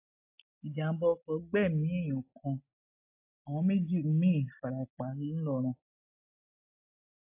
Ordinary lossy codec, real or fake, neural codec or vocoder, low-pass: AAC, 32 kbps; real; none; 3.6 kHz